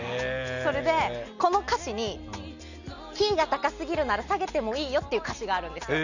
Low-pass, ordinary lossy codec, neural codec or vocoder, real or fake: 7.2 kHz; none; none; real